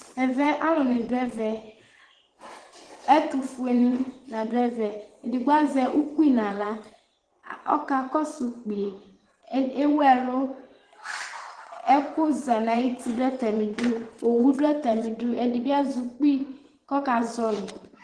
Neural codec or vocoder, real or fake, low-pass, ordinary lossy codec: vocoder, 22.05 kHz, 80 mel bands, WaveNeXt; fake; 9.9 kHz; Opus, 16 kbps